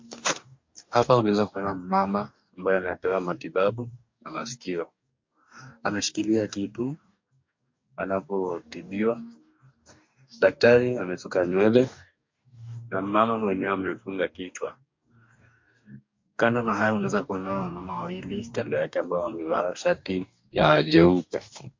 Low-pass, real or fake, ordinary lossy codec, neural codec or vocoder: 7.2 kHz; fake; MP3, 48 kbps; codec, 44.1 kHz, 2.6 kbps, DAC